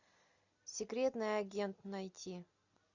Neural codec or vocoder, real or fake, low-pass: none; real; 7.2 kHz